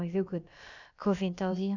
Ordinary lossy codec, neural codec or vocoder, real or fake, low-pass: none; codec, 16 kHz, about 1 kbps, DyCAST, with the encoder's durations; fake; 7.2 kHz